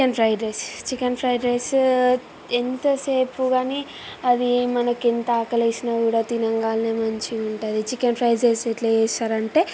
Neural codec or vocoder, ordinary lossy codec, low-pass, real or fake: none; none; none; real